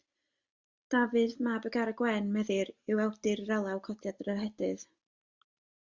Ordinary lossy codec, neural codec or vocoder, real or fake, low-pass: Opus, 64 kbps; none; real; 7.2 kHz